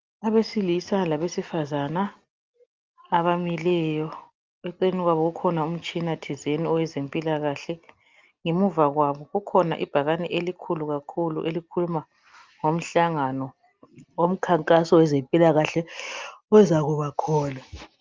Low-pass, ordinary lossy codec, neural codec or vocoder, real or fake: 7.2 kHz; Opus, 24 kbps; none; real